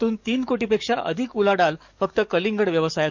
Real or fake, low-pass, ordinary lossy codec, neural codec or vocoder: fake; 7.2 kHz; none; codec, 44.1 kHz, 7.8 kbps, DAC